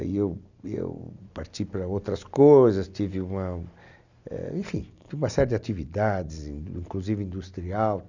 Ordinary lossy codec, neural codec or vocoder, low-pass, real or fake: none; none; 7.2 kHz; real